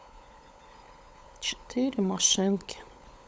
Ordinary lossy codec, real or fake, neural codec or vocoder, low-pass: none; fake; codec, 16 kHz, 16 kbps, FunCodec, trained on LibriTTS, 50 frames a second; none